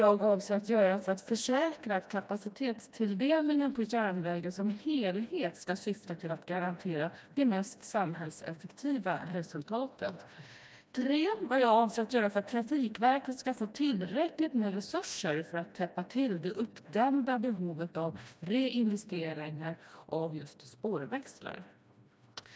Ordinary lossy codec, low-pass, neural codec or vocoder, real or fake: none; none; codec, 16 kHz, 1 kbps, FreqCodec, smaller model; fake